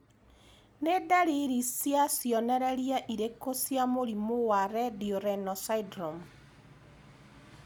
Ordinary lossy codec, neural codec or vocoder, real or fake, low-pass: none; none; real; none